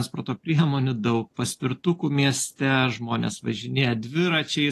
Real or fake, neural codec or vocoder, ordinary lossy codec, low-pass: real; none; AAC, 48 kbps; 14.4 kHz